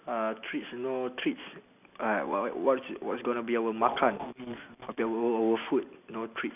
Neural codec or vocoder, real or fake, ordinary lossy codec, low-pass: none; real; none; 3.6 kHz